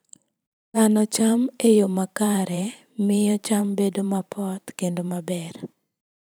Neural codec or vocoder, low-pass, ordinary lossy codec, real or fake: vocoder, 44.1 kHz, 128 mel bands every 512 samples, BigVGAN v2; none; none; fake